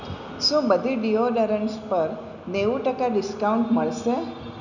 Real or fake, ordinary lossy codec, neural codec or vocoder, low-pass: real; none; none; 7.2 kHz